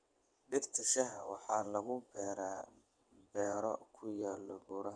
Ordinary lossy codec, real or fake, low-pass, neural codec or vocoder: none; fake; 9.9 kHz; vocoder, 22.05 kHz, 80 mel bands, WaveNeXt